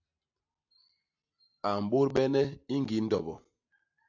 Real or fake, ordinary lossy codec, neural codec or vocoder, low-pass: real; AAC, 48 kbps; none; 7.2 kHz